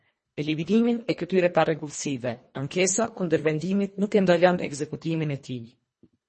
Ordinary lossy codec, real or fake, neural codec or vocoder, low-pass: MP3, 32 kbps; fake; codec, 24 kHz, 1.5 kbps, HILCodec; 10.8 kHz